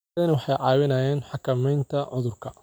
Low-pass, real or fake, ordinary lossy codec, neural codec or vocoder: none; real; none; none